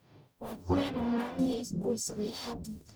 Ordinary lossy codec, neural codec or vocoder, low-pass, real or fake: none; codec, 44.1 kHz, 0.9 kbps, DAC; none; fake